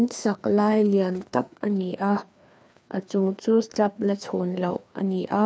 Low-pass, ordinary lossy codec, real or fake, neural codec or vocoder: none; none; fake; codec, 16 kHz, 2 kbps, FreqCodec, larger model